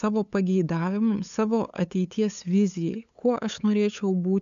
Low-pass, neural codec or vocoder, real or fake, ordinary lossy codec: 7.2 kHz; codec, 16 kHz, 8 kbps, FunCodec, trained on LibriTTS, 25 frames a second; fake; AAC, 96 kbps